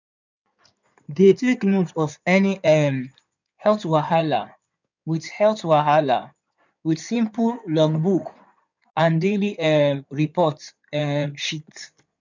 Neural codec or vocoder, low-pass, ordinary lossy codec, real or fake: codec, 16 kHz in and 24 kHz out, 2.2 kbps, FireRedTTS-2 codec; 7.2 kHz; none; fake